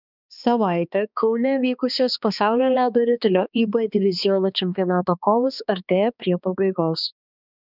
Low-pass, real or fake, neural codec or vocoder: 5.4 kHz; fake; codec, 16 kHz, 2 kbps, X-Codec, HuBERT features, trained on balanced general audio